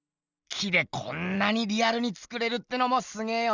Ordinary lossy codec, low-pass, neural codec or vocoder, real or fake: none; 7.2 kHz; none; real